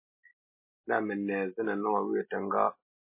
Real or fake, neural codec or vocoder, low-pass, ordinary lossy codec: real; none; 3.6 kHz; MP3, 24 kbps